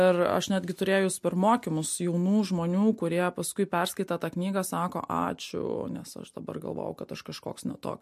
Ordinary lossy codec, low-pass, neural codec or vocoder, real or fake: MP3, 64 kbps; 14.4 kHz; none; real